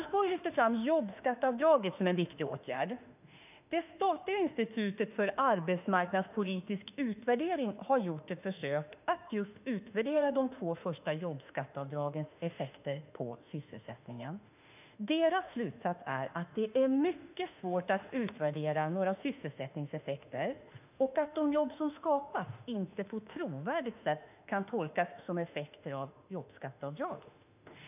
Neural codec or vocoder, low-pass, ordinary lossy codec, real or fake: autoencoder, 48 kHz, 32 numbers a frame, DAC-VAE, trained on Japanese speech; 3.6 kHz; none; fake